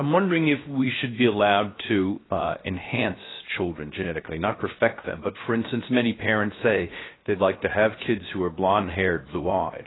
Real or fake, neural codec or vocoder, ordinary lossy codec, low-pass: fake; codec, 16 kHz, 0.7 kbps, FocalCodec; AAC, 16 kbps; 7.2 kHz